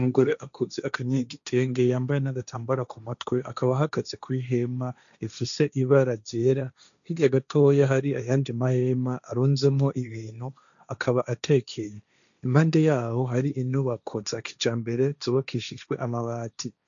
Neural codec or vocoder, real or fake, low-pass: codec, 16 kHz, 1.1 kbps, Voila-Tokenizer; fake; 7.2 kHz